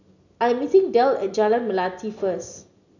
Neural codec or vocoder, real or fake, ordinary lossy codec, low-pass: none; real; none; 7.2 kHz